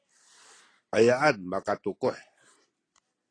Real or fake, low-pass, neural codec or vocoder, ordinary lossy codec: real; 9.9 kHz; none; AAC, 32 kbps